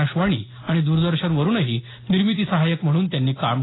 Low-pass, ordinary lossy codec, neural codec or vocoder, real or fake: 7.2 kHz; AAC, 16 kbps; none; real